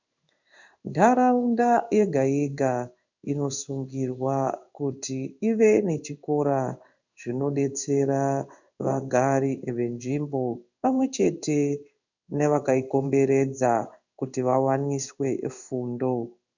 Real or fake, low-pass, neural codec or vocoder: fake; 7.2 kHz; codec, 16 kHz in and 24 kHz out, 1 kbps, XY-Tokenizer